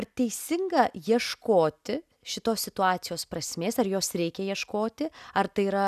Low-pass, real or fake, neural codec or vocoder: 14.4 kHz; real; none